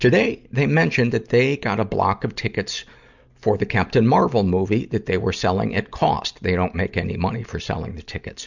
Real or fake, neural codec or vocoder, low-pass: real; none; 7.2 kHz